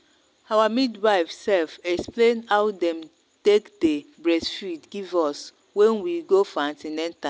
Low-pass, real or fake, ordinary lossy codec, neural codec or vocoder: none; real; none; none